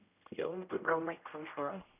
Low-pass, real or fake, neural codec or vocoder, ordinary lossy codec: 3.6 kHz; fake; codec, 16 kHz, 0.5 kbps, X-Codec, HuBERT features, trained on general audio; none